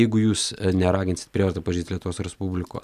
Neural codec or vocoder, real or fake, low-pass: none; real; 14.4 kHz